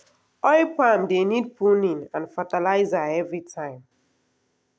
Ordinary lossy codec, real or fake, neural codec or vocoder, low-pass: none; real; none; none